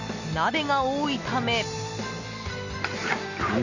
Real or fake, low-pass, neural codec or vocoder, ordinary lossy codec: real; 7.2 kHz; none; none